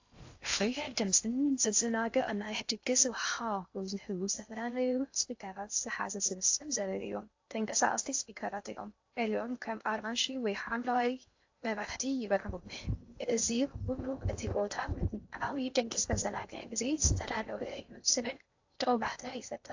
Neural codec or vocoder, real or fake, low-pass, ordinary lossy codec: codec, 16 kHz in and 24 kHz out, 0.6 kbps, FocalCodec, streaming, 2048 codes; fake; 7.2 kHz; AAC, 48 kbps